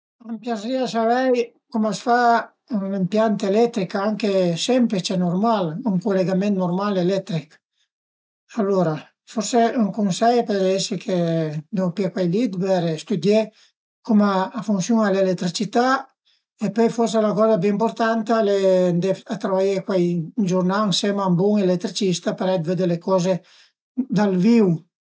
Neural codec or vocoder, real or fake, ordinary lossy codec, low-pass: none; real; none; none